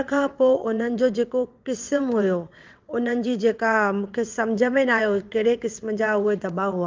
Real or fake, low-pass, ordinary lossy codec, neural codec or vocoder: fake; 7.2 kHz; Opus, 32 kbps; vocoder, 22.05 kHz, 80 mel bands, WaveNeXt